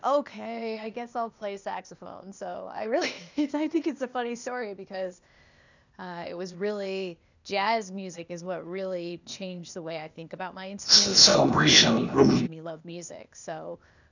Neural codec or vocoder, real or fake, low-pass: codec, 16 kHz, 0.8 kbps, ZipCodec; fake; 7.2 kHz